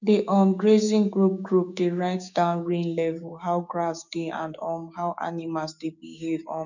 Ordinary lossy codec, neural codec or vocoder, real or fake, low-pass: none; codec, 16 kHz, 6 kbps, DAC; fake; 7.2 kHz